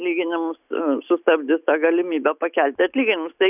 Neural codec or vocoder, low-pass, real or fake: none; 3.6 kHz; real